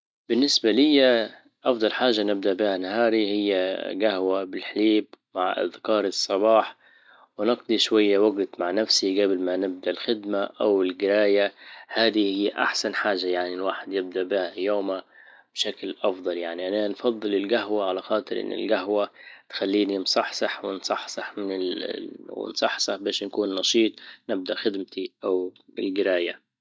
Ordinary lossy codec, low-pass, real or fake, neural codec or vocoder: none; none; real; none